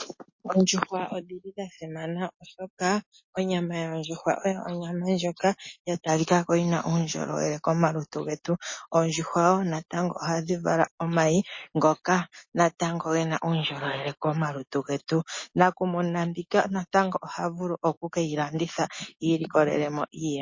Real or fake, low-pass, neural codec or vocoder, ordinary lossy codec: real; 7.2 kHz; none; MP3, 32 kbps